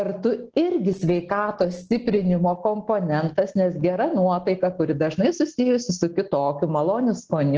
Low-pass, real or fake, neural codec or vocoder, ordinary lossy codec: 7.2 kHz; real; none; Opus, 16 kbps